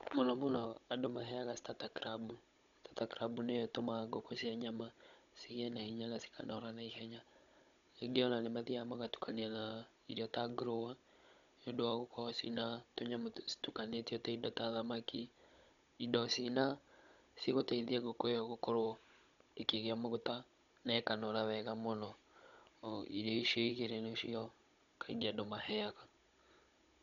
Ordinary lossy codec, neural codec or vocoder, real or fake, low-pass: none; codec, 16 kHz, 16 kbps, FunCodec, trained on Chinese and English, 50 frames a second; fake; 7.2 kHz